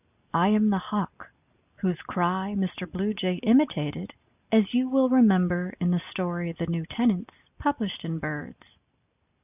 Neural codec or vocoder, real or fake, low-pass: none; real; 3.6 kHz